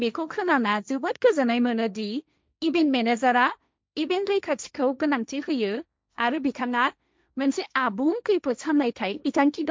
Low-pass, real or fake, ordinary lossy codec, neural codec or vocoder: none; fake; none; codec, 16 kHz, 1.1 kbps, Voila-Tokenizer